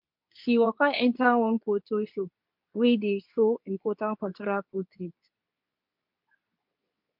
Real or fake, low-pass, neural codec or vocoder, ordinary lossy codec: fake; 5.4 kHz; codec, 24 kHz, 0.9 kbps, WavTokenizer, medium speech release version 2; MP3, 48 kbps